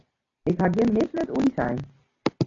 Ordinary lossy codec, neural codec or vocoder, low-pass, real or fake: AAC, 32 kbps; none; 7.2 kHz; real